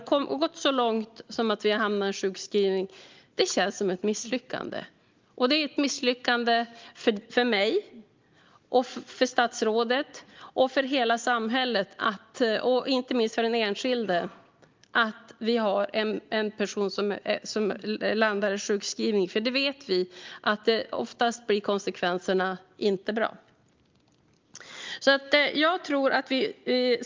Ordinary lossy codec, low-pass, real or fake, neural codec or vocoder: Opus, 32 kbps; 7.2 kHz; real; none